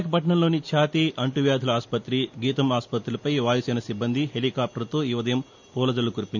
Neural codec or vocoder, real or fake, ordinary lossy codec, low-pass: none; real; none; 7.2 kHz